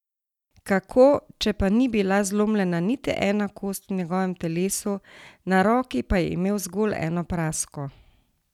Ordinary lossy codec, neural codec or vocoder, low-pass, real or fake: none; none; 19.8 kHz; real